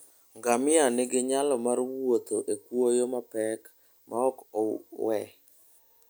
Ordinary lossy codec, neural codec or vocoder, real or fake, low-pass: none; none; real; none